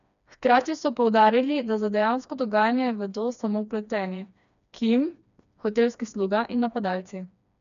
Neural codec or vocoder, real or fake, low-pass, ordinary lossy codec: codec, 16 kHz, 2 kbps, FreqCodec, smaller model; fake; 7.2 kHz; none